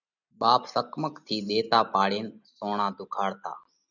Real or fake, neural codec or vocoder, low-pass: real; none; 7.2 kHz